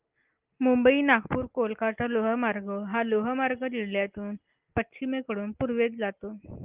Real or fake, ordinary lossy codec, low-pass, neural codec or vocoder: real; Opus, 32 kbps; 3.6 kHz; none